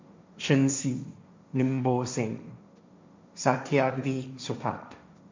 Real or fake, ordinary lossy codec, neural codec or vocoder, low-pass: fake; MP3, 64 kbps; codec, 16 kHz, 1.1 kbps, Voila-Tokenizer; 7.2 kHz